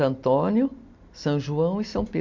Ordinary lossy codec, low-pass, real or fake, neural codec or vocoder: MP3, 48 kbps; 7.2 kHz; real; none